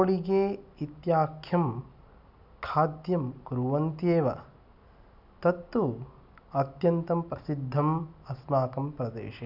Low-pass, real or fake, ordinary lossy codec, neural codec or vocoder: 5.4 kHz; real; none; none